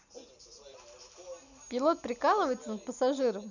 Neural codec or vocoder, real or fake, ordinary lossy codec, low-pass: none; real; none; 7.2 kHz